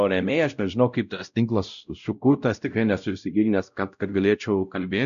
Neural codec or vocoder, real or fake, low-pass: codec, 16 kHz, 0.5 kbps, X-Codec, WavLM features, trained on Multilingual LibriSpeech; fake; 7.2 kHz